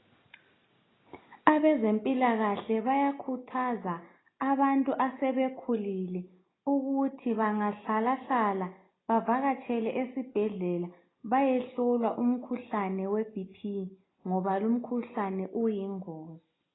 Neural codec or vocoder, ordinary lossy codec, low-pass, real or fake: none; AAC, 16 kbps; 7.2 kHz; real